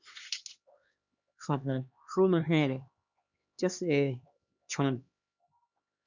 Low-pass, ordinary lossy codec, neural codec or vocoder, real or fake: 7.2 kHz; Opus, 64 kbps; codec, 16 kHz, 2 kbps, X-Codec, HuBERT features, trained on LibriSpeech; fake